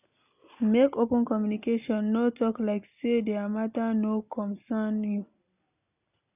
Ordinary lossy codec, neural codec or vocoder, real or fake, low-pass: none; none; real; 3.6 kHz